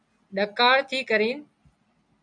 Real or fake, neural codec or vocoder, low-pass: real; none; 9.9 kHz